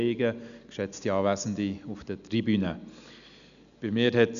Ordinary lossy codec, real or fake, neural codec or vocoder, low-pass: none; real; none; 7.2 kHz